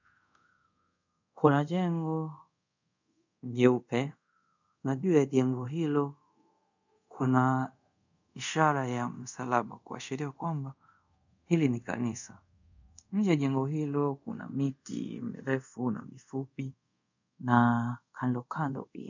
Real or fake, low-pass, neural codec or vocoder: fake; 7.2 kHz; codec, 24 kHz, 0.5 kbps, DualCodec